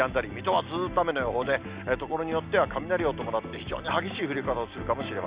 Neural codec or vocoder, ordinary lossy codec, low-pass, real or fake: none; Opus, 64 kbps; 3.6 kHz; real